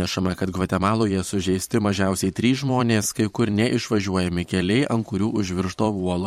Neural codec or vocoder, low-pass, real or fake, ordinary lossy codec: vocoder, 44.1 kHz, 128 mel bands every 512 samples, BigVGAN v2; 19.8 kHz; fake; MP3, 64 kbps